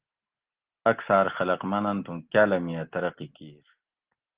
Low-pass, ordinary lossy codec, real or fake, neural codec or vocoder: 3.6 kHz; Opus, 16 kbps; real; none